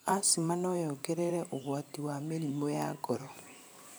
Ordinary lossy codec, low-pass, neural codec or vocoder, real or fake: none; none; vocoder, 44.1 kHz, 128 mel bands every 512 samples, BigVGAN v2; fake